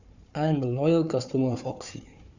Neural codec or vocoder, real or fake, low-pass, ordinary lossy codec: codec, 16 kHz, 4 kbps, FunCodec, trained on Chinese and English, 50 frames a second; fake; 7.2 kHz; Opus, 64 kbps